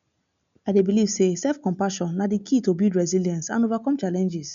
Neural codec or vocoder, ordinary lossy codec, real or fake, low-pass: none; MP3, 96 kbps; real; 7.2 kHz